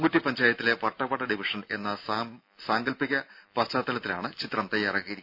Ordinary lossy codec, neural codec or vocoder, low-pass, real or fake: none; none; 5.4 kHz; real